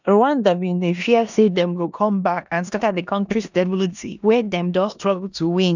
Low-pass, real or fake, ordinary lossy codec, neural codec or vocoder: 7.2 kHz; fake; none; codec, 16 kHz in and 24 kHz out, 0.9 kbps, LongCat-Audio-Codec, four codebook decoder